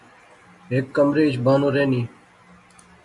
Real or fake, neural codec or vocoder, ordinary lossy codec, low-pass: real; none; MP3, 64 kbps; 10.8 kHz